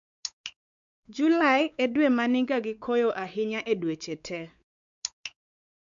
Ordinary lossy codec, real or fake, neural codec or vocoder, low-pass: none; fake; codec, 16 kHz, 4 kbps, X-Codec, WavLM features, trained on Multilingual LibriSpeech; 7.2 kHz